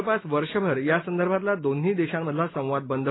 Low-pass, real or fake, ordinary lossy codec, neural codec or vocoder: 7.2 kHz; real; AAC, 16 kbps; none